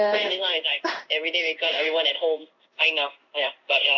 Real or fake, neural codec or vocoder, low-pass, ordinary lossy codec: fake; codec, 16 kHz in and 24 kHz out, 1 kbps, XY-Tokenizer; 7.2 kHz; none